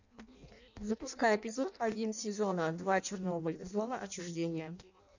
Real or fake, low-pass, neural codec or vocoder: fake; 7.2 kHz; codec, 16 kHz in and 24 kHz out, 0.6 kbps, FireRedTTS-2 codec